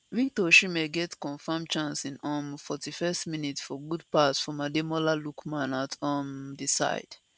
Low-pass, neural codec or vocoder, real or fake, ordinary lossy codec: none; none; real; none